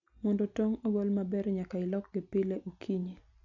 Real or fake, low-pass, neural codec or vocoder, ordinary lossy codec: real; 7.2 kHz; none; none